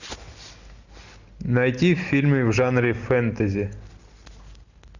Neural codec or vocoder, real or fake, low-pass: none; real; 7.2 kHz